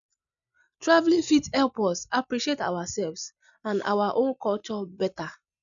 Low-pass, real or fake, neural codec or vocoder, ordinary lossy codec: 7.2 kHz; real; none; none